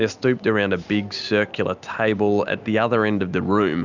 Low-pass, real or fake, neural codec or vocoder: 7.2 kHz; real; none